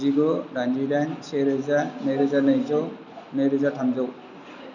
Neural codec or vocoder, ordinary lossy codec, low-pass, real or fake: none; none; 7.2 kHz; real